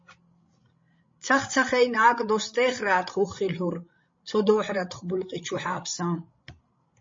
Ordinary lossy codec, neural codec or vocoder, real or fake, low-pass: MP3, 32 kbps; codec, 16 kHz, 16 kbps, FreqCodec, larger model; fake; 7.2 kHz